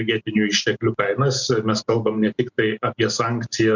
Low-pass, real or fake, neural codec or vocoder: 7.2 kHz; real; none